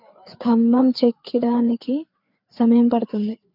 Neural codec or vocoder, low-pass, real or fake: codec, 16 kHz, 4 kbps, FreqCodec, larger model; 5.4 kHz; fake